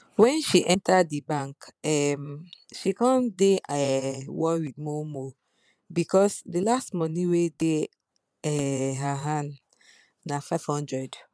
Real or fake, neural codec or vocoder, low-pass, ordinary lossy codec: fake; vocoder, 22.05 kHz, 80 mel bands, Vocos; none; none